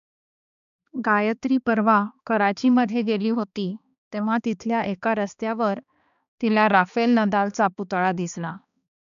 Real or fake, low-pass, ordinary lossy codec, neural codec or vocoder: fake; 7.2 kHz; none; codec, 16 kHz, 2 kbps, X-Codec, HuBERT features, trained on balanced general audio